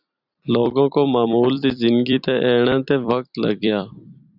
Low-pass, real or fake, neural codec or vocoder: 5.4 kHz; fake; vocoder, 44.1 kHz, 128 mel bands every 256 samples, BigVGAN v2